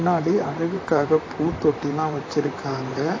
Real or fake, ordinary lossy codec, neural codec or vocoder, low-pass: fake; AAC, 32 kbps; vocoder, 44.1 kHz, 128 mel bands, Pupu-Vocoder; 7.2 kHz